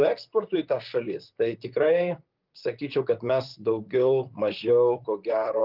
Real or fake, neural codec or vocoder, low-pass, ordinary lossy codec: fake; vocoder, 44.1 kHz, 128 mel bands, Pupu-Vocoder; 5.4 kHz; Opus, 24 kbps